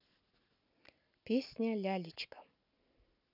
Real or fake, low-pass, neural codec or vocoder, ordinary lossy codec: real; 5.4 kHz; none; none